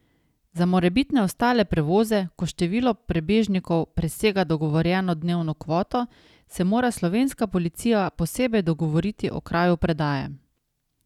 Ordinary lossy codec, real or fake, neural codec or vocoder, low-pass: none; real; none; 19.8 kHz